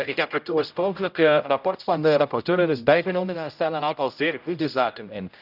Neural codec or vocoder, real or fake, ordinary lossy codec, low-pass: codec, 16 kHz, 0.5 kbps, X-Codec, HuBERT features, trained on general audio; fake; none; 5.4 kHz